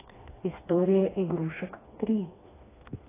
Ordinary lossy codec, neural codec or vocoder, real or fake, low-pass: AAC, 16 kbps; codec, 16 kHz, 2 kbps, FreqCodec, smaller model; fake; 3.6 kHz